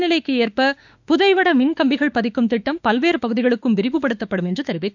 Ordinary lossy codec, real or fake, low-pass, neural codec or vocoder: none; fake; 7.2 kHz; autoencoder, 48 kHz, 32 numbers a frame, DAC-VAE, trained on Japanese speech